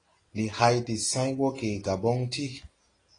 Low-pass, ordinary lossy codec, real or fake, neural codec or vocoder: 9.9 kHz; AAC, 32 kbps; real; none